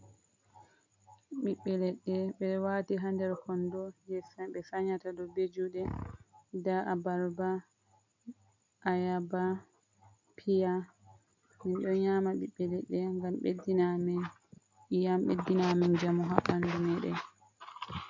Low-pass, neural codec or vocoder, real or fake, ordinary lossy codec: 7.2 kHz; none; real; MP3, 64 kbps